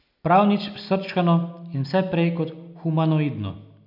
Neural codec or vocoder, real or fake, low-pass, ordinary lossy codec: none; real; 5.4 kHz; none